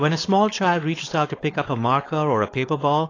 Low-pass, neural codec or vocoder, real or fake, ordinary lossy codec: 7.2 kHz; codec, 16 kHz, 4.8 kbps, FACodec; fake; AAC, 32 kbps